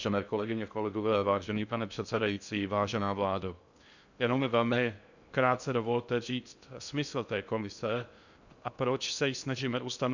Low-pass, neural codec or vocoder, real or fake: 7.2 kHz; codec, 16 kHz in and 24 kHz out, 0.6 kbps, FocalCodec, streaming, 2048 codes; fake